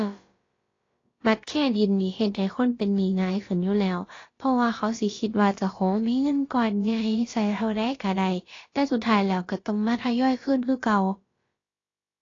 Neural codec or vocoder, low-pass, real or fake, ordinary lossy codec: codec, 16 kHz, about 1 kbps, DyCAST, with the encoder's durations; 7.2 kHz; fake; AAC, 32 kbps